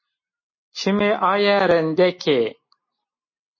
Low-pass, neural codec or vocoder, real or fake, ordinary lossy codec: 7.2 kHz; none; real; MP3, 32 kbps